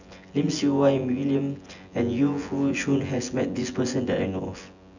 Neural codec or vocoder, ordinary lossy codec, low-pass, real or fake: vocoder, 24 kHz, 100 mel bands, Vocos; none; 7.2 kHz; fake